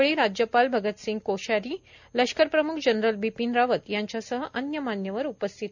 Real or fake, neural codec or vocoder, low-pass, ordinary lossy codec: real; none; 7.2 kHz; none